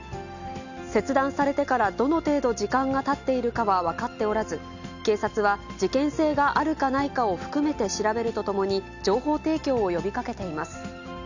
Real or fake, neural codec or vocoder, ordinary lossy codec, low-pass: real; none; none; 7.2 kHz